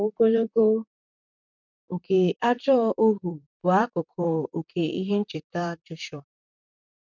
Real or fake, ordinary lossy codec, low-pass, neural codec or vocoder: fake; none; 7.2 kHz; vocoder, 44.1 kHz, 128 mel bands, Pupu-Vocoder